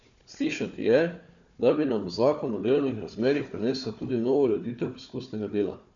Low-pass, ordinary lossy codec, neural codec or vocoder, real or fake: 7.2 kHz; none; codec, 16 kHz, 4 kbps, FunCodec, trained on Chinese and English, 50 frames a second; fake